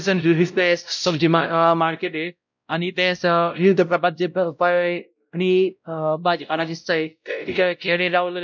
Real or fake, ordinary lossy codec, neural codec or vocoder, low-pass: fake; none; codec, 16 kHz, 0.5 kbps, X-Codec, WavLM features, trained on Multilingual LibriSpeech; 7.2 kHz